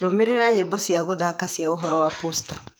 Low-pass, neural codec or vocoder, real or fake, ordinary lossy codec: none; codec, 44.1 kHz, 2.6 kbps, SNAC; fake; none